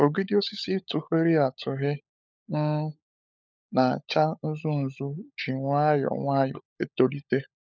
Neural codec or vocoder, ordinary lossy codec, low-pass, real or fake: codec, 16 kHz, 16 kbps, FunCodec, trained on LibriTTS, 50 frames a second; none; none; fake